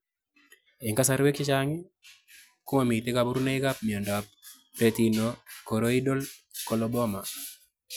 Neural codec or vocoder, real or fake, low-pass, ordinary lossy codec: none; real; none; none